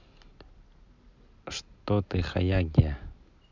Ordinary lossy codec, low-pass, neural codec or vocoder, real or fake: MP3, 64 kbps; 7.2 kHz; none; real